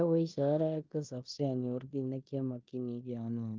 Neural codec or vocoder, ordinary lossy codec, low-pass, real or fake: codec, 16 kHz in and 24 kHz out, 0.9 kbps, LongCat-Audio-Codec, four codebook decoder; Opus, 32 kbps; 7.2 kHz; fake